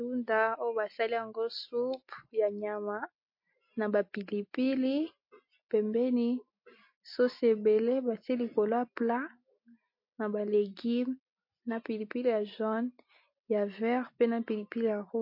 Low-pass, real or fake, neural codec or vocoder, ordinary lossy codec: 5.4 kHz; real; none; MP3, 32 kbps